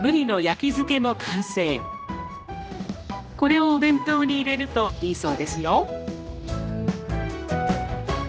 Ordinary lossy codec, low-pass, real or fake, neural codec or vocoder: none; none; fake; codec, 16 kHz, 1 kbps, X-Codec, HuBERT features, trained on general audio